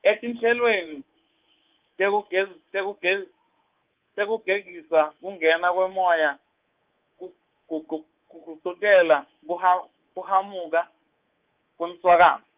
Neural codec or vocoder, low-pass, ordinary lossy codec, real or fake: codec, 24 kHz, 3.1 kbps, DualCodec; 3.6 kHz; Opus, 24 kbps; fake